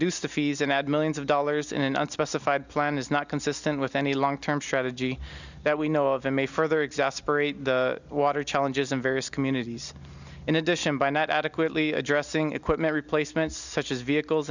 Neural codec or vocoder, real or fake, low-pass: none; real; 7.2 kHz